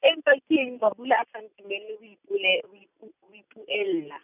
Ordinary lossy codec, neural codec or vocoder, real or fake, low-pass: none; none; real; 3.6 kHz